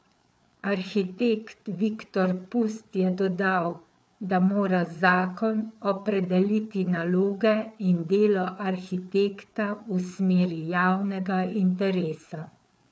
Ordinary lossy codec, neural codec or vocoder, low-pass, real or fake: none; codec, 16 kHz, 4 kbps, FreqCodec, larger model; none; fake